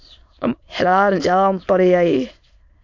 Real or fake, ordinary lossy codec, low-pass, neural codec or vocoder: fake; AAC, 48 kbps; 7.2 kHz; autoencoder, 22.05 kHz, a latent of 192 numbers a frame, VITS, trained on many speakers